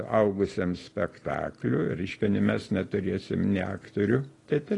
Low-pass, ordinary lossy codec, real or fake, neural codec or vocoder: 10.8 kHz; AAC, 32 kbps; real; none